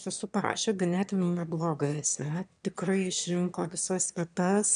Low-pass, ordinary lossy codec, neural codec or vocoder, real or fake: 9.9 kHz; MP3, 96 kbps; autoencoder, 22.05 kHz, a latent of 192 numbers a frame, VITS, trained on one speaker; fake